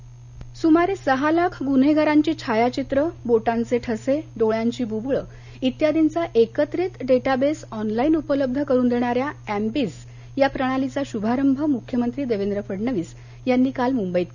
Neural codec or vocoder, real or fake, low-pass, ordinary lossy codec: none; real; 7.2 kHz; none